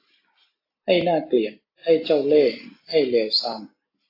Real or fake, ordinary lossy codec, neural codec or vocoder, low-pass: real; AAC, 32 kbps; none; 5.4 kHz